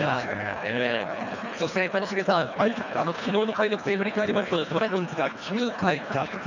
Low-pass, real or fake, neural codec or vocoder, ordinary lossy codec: 7.2 kHz; fake; codec, 24 kHz, 1.5 kbps, HILCodec; none